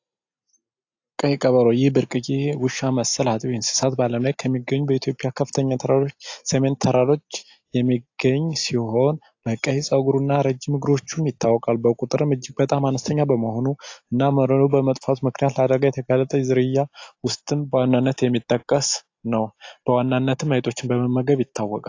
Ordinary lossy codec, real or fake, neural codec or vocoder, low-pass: AAC, 48 kbps; real; none; 7.2 kHz